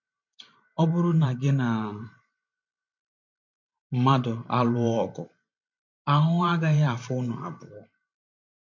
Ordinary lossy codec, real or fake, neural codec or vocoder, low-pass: MP3, 48 kbps; fake; vocoder, 44.1 kHz, 128 mel bands every 512 samples, BigVGAN v2; 7.2 kHz